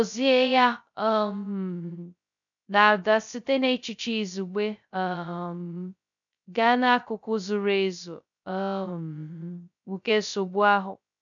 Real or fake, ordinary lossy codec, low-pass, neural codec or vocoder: fake; none; 7.2 kHz; codec, 16 kHz, 0.2 kbps, FocalCodec